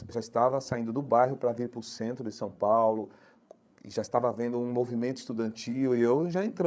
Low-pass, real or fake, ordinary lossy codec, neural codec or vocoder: none; fake; none; codec, 16 kHz, 8 kbps, FreqCodec, larger model